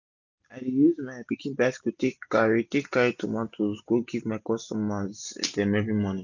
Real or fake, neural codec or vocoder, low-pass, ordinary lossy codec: real; none; 7.2 kHz; none